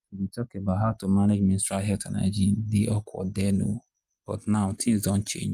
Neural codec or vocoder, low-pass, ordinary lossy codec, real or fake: none; 14.4 kHz; Opus, 32 kbps; real